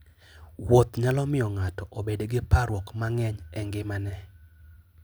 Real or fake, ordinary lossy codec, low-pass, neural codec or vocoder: real; none; none; none